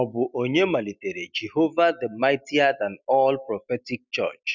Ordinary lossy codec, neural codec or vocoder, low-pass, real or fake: none; none; 7.2 kHz; real